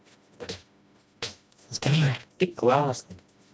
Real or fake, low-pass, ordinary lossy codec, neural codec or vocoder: fake; none; none; codec, 16 kHz, 1 kbps, FreqCodec, smaller model